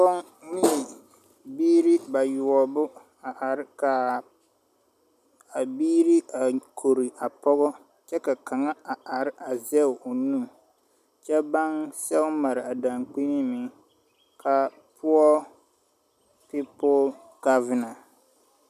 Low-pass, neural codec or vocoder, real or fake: 14.4 kHz; none; real